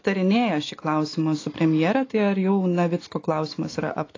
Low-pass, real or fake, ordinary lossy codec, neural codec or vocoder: 7.2 kHz; real; AAC, 32 kbps; none